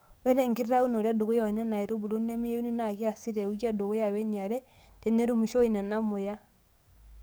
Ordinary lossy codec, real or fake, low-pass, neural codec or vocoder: none; fake; none; codec, 44.1 kHz, 7.8 kbps, DAC